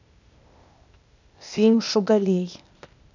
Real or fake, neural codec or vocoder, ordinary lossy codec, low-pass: fake; codec, 16 kHz, 0.8 kbps, ZipCodec; none; 7.2 kHz